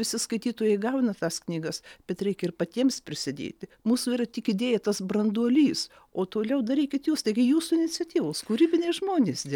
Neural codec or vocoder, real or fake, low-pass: none; real; 19.8 kHz